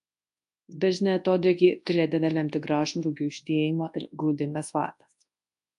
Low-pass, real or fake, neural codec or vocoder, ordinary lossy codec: 10.8 kHz; fake; codec, 24 kHz, 0.9 kbps, WavTokenizer, large speech release; AAC, 64 kbps